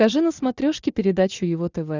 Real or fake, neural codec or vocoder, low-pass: real; none; 7.2 kHz